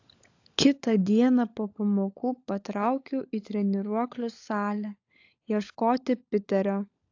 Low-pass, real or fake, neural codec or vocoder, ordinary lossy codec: 7.2 kHz; fake; codec, 16 kHz, 16 kbps, FunCodec, trained on LibriTTS, 50 frames a second; AAC, 48 kbps